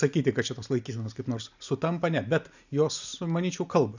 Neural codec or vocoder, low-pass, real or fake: none; 7.2 kHz; real